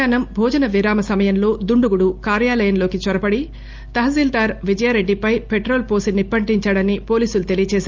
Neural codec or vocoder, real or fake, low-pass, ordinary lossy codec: none; real; 7.2 kHz; Opus, 32 kbps